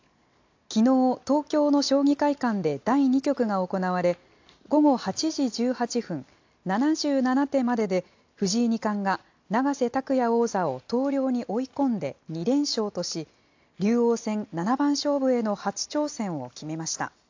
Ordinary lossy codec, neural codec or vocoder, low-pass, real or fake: AAC, 48 kbps; none; 7.2 kHz; real